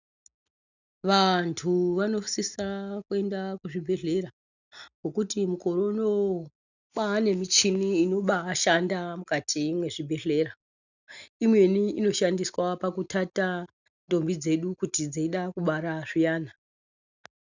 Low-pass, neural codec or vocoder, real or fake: 7.2 kHz; none; real